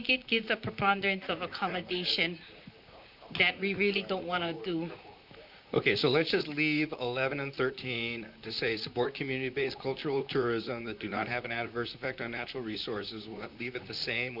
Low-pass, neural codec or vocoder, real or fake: 5.4 kHz; vocoder, 44.1 kHz, 128 mel bands, Pupu-Vocoder; fake